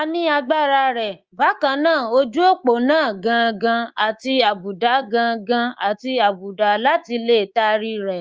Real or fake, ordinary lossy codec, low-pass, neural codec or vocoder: fake; Opus, 24 kbps; 7.2 kHz; codec, 24 kHz, 3.1 kbps, DualCodec